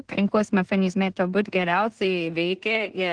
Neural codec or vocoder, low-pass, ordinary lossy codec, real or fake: codec, 16 kHz in and 24 kHz out, 0.4 kbps, LongCat-Audio-Codec, two codebook decoder; 9.9 kHz; Opus, 16 kbps; fake